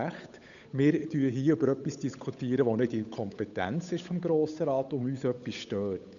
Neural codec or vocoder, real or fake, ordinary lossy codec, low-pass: codec, 16 kHz, 8 kbps, FunCodec, trained on Chinese and English, 25 frames a second; fake; none; 7.2 kHz